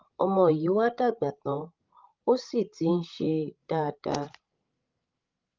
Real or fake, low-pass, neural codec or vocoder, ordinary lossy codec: fake; 7.2 kHz; codec, 16 kHz, 16 kbps, FreqCodec, larger model; Opus, 32 kbps